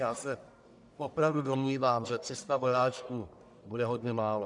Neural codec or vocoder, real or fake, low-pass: codec, 44.1 kHz, 1.7 kbps, Pupu-Codec; fake; 10.8 kHz